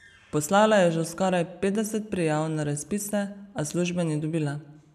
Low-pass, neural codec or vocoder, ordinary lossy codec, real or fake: 14.4 kHz; none; none; real